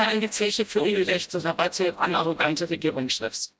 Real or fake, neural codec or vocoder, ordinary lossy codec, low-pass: fake; codec, 16 kHz, 0.5 kbps, FreqCodec, smaller model; none; none